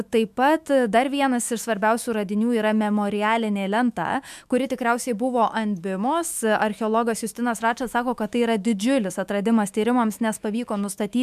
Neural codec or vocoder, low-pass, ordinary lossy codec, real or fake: autoencoder, 48 kHz, 128 numbers a frame, DAC-VAE, trained on Japanese speech; 14.4 kHz; MP3, 96 kbps; fake